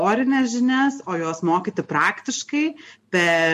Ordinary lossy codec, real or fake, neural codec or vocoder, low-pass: MP3, 64 kbps; real; none; 14.4 kHz